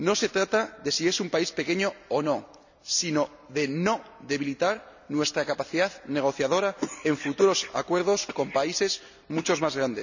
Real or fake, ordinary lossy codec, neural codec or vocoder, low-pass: real; none; none; 7.2 kHz